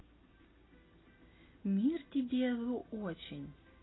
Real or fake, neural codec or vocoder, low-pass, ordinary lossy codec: real; none; 7.2 kHz; AAC, 16 kbps